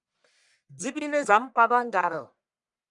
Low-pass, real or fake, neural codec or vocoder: 10.8 kHz; fake; codec, 44.1 kHz, 1.7 kbps, Pupu-Codec